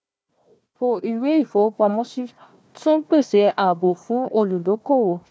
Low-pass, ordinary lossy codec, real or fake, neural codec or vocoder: none; none; fake; codec, 16 kHz, 1 kbps, FunCodec, trained on Chinese and English, 50 frames a second